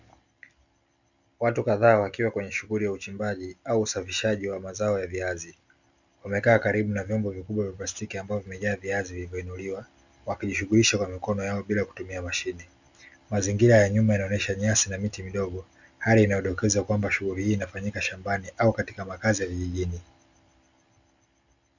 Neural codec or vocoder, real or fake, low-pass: none; real; 7.2 kHz